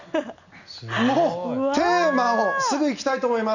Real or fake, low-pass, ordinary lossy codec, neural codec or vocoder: real; 7.2 kHz; none; none